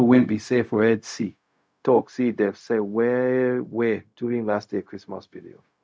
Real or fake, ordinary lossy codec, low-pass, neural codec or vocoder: fake; none; none; codec, 16 kHz, 0.4 kbps, LongCat-Audio-Codec